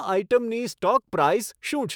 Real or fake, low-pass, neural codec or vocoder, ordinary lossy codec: fake; none; autoencoder, 48 kHz, 128 numbers a frame, DAC-VAE, trained on Japanese speech; none